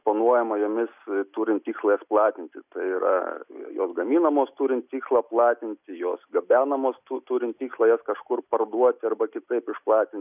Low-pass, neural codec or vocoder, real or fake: 3.6 kHz; none; real